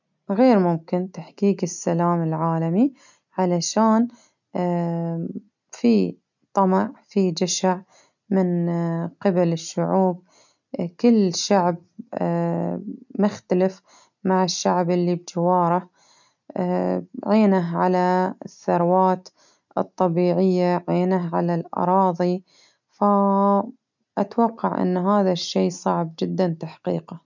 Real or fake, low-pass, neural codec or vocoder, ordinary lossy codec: real; 7.2 kHz; none; none